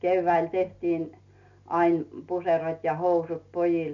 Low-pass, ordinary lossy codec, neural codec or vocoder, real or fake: 7.2 kHz; none; none; real